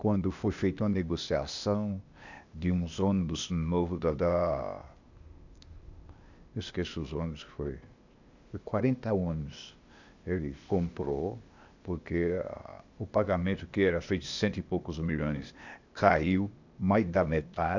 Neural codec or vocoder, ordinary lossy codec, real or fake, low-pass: codec, 16 kHz, 0.8 kbps, ZipCodec; none; fake; 7.2 kHz